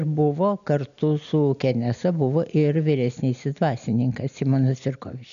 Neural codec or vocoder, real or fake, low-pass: none; real; 7.2 kHz